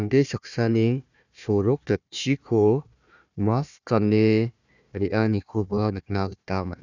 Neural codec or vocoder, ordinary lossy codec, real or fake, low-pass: codec, 16 kHz, 1 kbps, FunCodec, trained on Chinese and English, 50 frames a second; none; fake; 7.2 kHz